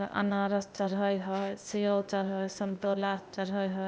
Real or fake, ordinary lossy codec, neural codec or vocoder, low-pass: fake; none; codec, 16 kHz, 0.8 kbps, ZipCodec; none